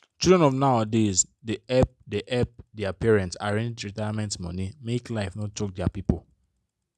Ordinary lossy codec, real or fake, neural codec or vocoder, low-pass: none; real; none; none